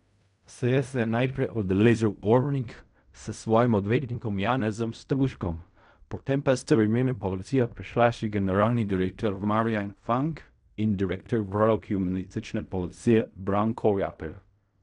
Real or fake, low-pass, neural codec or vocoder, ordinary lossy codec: fake; 10.8 kHz; codec, 16 kHz in and 24 kHz out, 0.4 kbps, LongCat-Audio-Codec, fine tuned four codebook decoder; none